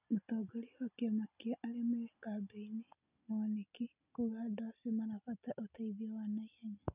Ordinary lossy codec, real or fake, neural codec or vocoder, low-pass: none; real; none; 3.6 kHz